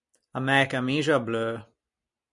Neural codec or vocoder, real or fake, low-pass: none; real; 10.8 kHz